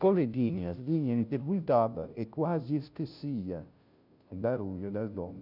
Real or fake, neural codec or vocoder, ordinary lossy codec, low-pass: fake; codec, 16 kHz, 0.5 kbps, FunCodec, trained on Chinese and English, 25 frames a second; none; 5.4 kHz